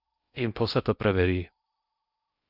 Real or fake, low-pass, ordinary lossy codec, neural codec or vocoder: fake; 5.4 kHz; Opus, 64 kbps; codec, 16 kHz in and 24 kHz out, 0.8 kbps, FocalCodec, streaming, 65536 codes